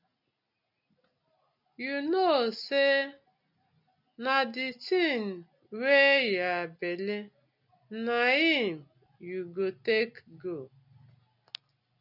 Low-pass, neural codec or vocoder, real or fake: 5.4 kHz; none; real